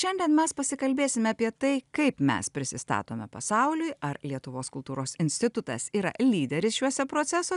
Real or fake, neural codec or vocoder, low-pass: real; none; 10.8 kHz